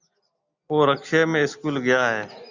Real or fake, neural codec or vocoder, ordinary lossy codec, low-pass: real; none; Opus, 64 kbps; 7.2 kHz